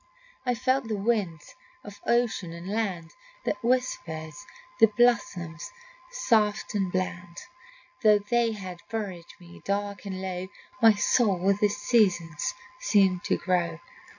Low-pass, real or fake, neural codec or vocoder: 7.2 kHz; real; none